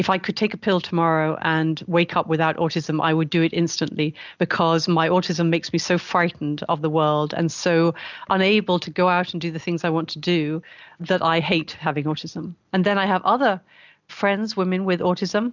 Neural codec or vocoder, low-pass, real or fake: none; 7.2 kHz; real